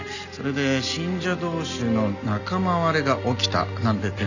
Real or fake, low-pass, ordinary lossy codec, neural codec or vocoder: real; 7.2 kHz; none; none